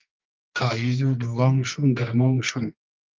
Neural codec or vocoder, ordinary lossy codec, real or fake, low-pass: codec, 24 kHz, 0.9 kbps, WavTokenizer, medium music audio release; Opus, 32 kbps; fake; 7.2 kHz